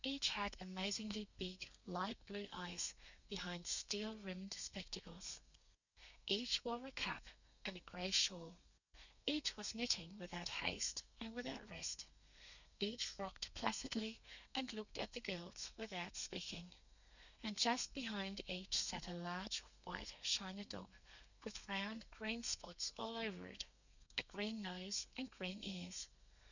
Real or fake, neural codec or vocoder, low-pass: fake; codec, 32 kHz, 1.9 kbps, SNAC; 7.2 kHz